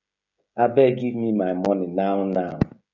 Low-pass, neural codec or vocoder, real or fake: 7.2 kHz; codec, 16 kHz, 16 kbps, FreqCodec, smaller model; fake